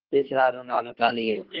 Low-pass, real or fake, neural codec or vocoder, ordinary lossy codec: 5.4 kHz; fake; codec, 24 kHz, 1 kbps, SNAC; Opus, 16 kbps